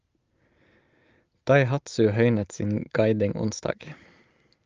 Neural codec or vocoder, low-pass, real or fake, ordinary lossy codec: none; 7.2 kHz; real; Opus, 24 kbps